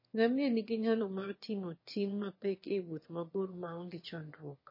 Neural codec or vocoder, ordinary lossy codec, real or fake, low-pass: autoencoder, 22.05 kHz, a latent of 192 numbers a frame, VITS, trained on one speaker; MP3, 24 kbps; fake; 5.4 kHz